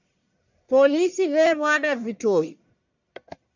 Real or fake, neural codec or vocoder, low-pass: fake; codec, 44.1 kHz, 1.7 kbps, Pupu-Codec; 7.2 kHz